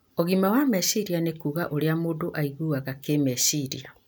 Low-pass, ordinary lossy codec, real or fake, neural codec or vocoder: none; none; real; none